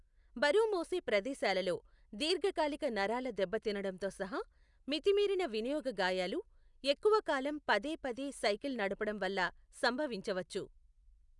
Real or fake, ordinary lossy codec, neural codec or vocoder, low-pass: real; none; none; 10.8 kHz